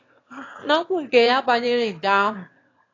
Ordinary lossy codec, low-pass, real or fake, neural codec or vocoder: AAC, 32 kbps; 7.2 kHz; fake; autoencoder, 22.05 kHz, a latent of 192 numbers a frame, VITS, trained on one speaker